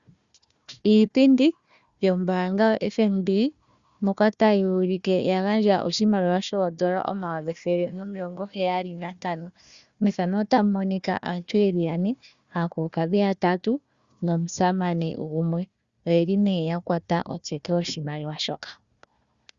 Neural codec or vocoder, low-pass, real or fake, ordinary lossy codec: codec, 16 kHz, 1 kbps, FunCodec, trained on Chinese and English, 50 frames a second; 7.2 kHz; fake; Opus, 64 kbps